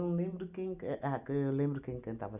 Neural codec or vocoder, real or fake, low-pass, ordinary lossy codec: none; real; 3.6 kHz; none